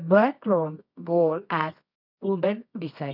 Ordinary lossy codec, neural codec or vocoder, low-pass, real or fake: none; codec, 24 kHz, 0.9 kbps, WavTokenizer, medium music audio release; 5.4 kHz; fake